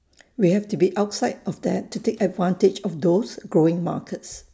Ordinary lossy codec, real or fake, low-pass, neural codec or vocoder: none; real; none; none